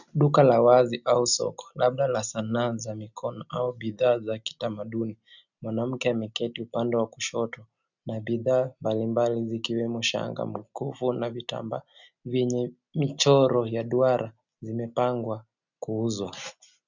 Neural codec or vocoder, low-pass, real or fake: none; 7.2 kHz; real